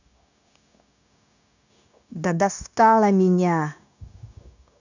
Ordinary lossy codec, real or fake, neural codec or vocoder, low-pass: none; fake; codec, 16 kHz, 0.9 kbps, LongCat-Audio-Codec; 7.2 kHz